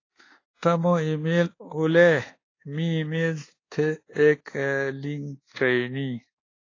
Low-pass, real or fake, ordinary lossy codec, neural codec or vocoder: 7.2 kHz; fake; AAC, 32 kbps; codec, 24 kHz, 1.2 kbps, DualCodec